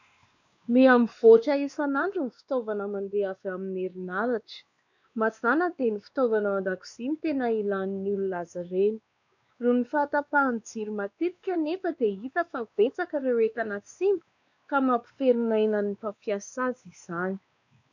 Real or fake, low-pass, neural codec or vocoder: fake; 7.2 kHz; codec, 16 kHz, 2 kbps, X-Codec, WavLM features, trained on Multilingual LibriSpeech